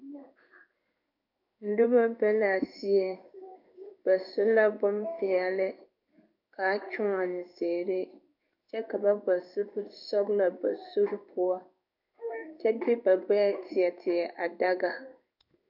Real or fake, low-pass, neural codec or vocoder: fake; 5.4 kHz; codec, 16 kHz in and 24 kHz out, 1 kbps, XY-Tokenizer